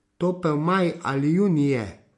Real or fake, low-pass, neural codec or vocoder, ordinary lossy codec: real; 10.8 kHz; none; MP3, 48 kbps